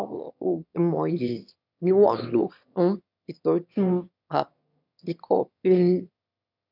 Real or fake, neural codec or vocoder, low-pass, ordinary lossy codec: fake; autoencoder, 22.05 kHz, a latent of 192 numbers a frame, VITS, trained on one speaker; 5.4 kHz; none